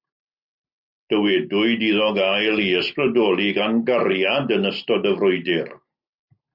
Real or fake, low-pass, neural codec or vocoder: real; 5.4 kHz; none